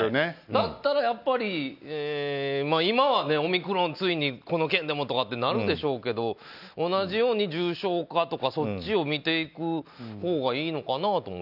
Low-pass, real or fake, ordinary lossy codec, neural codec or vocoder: 5.4 kHz; real; none; none